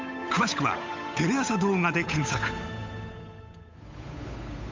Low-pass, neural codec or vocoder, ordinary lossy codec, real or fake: 7.2 kHz; codec, 16 kHz, 8 kbps, FunCodec, trained on Chinese and English, 25 frames a second; MP3, 64 kbps; fake